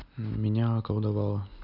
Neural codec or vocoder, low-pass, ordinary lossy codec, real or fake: none; 5.4 kHz; none; real